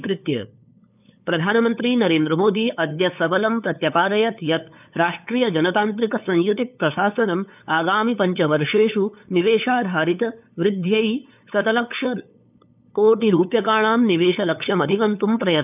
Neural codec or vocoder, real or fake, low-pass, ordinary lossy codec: codec, 16 kHz, 8 kbps, FunCodec, trained on LibriTTS, 25 frames a second; fake; 3.6 kHz; none